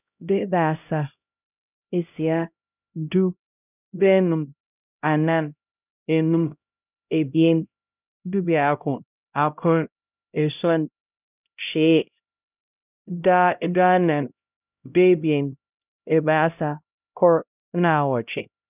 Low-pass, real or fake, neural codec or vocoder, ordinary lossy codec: 3.6 kHz; fake; codec, 16 kHz, 0.5 kbps, X-Codec, HuBERT features, trained on LibriSpeech; none